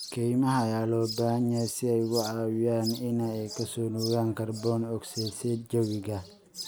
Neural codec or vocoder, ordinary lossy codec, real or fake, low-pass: none; none; real; none